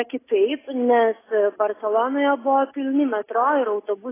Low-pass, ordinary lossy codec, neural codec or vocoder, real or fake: 3.6 kHz; AAC, 16 kbps; none; real